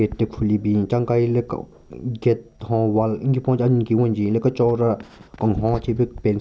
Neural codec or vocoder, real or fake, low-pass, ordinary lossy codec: none; real; none; none